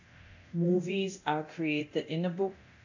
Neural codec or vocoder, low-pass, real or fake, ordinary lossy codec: codec, 24 kHz, 0.9 kbps, DualCodec; 7.2 kHz; fake; AAC, 48 kbps